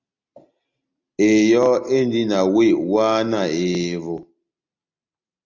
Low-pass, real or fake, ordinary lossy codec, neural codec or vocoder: 7.2 kHz; real; Opus, 64 kbps; none